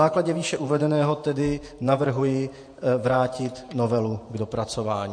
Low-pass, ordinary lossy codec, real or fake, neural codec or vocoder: 9.9 kHz; MP3, 48 kbps; fake; vocoder, 48 kHz, 128 mel bands, Vocos